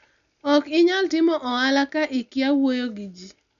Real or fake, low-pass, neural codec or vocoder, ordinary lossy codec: real; 7.2 kHz; none; none